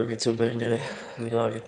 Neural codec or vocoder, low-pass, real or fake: autoencoder, 22.05 kHz, a latent of 192 numbers a frame, VITS, trained on one speaker; 9.9 kHz; fake